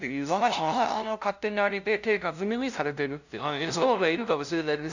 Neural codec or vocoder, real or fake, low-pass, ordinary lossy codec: codec, 16 kHz, 0.5 kbps, FunCodec, trained on LibriTTS, 25 frames a second; fake; 7.2 kHz; none